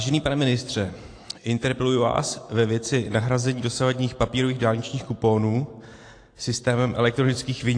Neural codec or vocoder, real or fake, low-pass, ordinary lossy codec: none; real; 9.9 kHz; AAC, 48 kbps